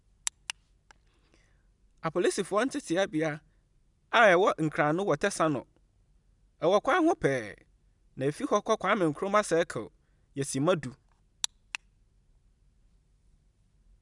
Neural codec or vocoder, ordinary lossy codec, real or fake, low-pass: none; none; real; 10.8 kHz